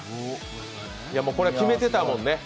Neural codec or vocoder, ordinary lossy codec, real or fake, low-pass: none; none; real; none